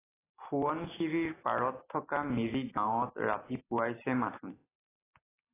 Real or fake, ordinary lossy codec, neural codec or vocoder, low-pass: real; AAC, 16 kbps; none; 3.6 kHz